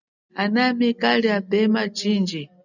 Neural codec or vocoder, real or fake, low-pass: none; real; 7.2 kHz